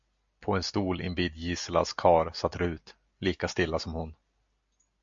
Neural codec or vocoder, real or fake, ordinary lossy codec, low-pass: none; real; AAC, 64 kbps; 7.2 kHz